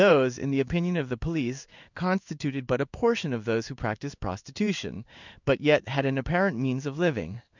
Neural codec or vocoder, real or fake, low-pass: codec, 16 kHz in and 24 kHz out, 1 kbps, XY-Tokenizer; fake; 7.2 kHz